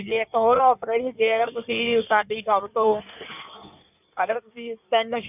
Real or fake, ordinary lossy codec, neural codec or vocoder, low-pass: fake; none; codec, 16 kHz in and 24 kHz out, 1.1 kbps, FireRedTTS-2 codec; 3.6 kHz